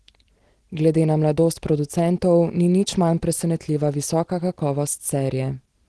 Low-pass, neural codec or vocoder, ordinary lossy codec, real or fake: 10.8 kHz; none; Opus, 16 kbps; real